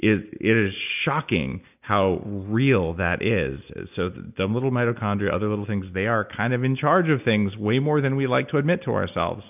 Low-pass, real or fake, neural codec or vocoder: 3.6 kHz; real; none